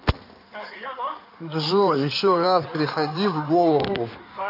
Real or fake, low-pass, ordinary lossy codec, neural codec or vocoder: fake; 5.4 kHz; none; codec, 16 kHz in and 24 kHz out, 2.2 kbps, FireRedTTS-2 codec